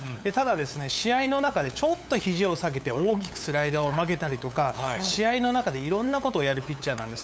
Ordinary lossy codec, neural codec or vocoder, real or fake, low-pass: none; codec, 16 kHz, 8 kbps, FunCodec, trained on LibriTTS, 25 frames a second; fake; none